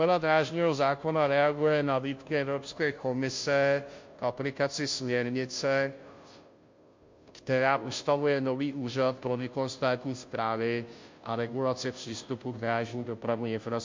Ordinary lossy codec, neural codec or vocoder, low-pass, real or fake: MP3, 48 kbps; codec, 16 kHz, 0.5 kbps, FunCodec, trained on Chinese and English, 25 frames a second; 7.2 kHz; fake